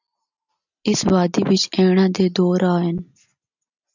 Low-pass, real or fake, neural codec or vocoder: 7.2 kHz; real; none